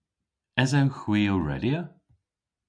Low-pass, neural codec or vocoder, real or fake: 9.9 kHz; none; real